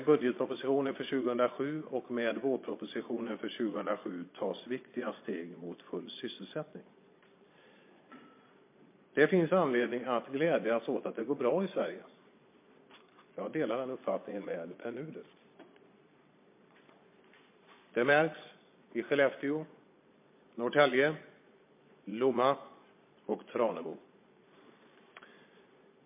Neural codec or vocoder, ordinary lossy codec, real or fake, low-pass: vocoder, 22.05 kHz, 80 mel bands, Vocos; MP3, 24 kbps; fake; 3.6 kHz